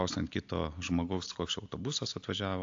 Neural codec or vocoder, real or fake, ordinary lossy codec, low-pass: none; real; AAC, 48 kbps; 7.2 kHz